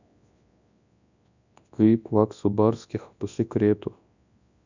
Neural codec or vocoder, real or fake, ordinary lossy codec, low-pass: codec, 24 kHz, 0.9 kbps, WavTokenizer, large speech release; fake; none; 7.2 kHz